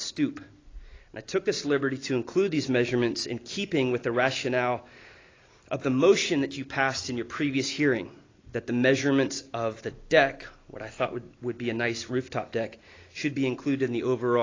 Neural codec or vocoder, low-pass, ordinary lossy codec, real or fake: none; 7.2 kHz; AAC, 32 kbps; real